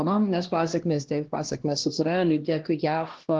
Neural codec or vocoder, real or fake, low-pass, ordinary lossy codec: codec, 16 kHz, 1 kbps, X-Codec, HuBERT features, trained on LibriSpeech; fake; 7.2 kHz; Opus, 16 kbps